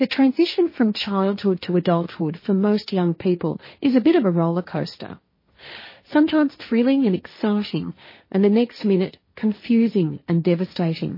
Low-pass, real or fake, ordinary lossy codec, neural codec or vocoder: 5.4 kHz; fake; MP3, 24 kbps; codec, 16 kHz, 1.1 kbps, Voila-Tokenizer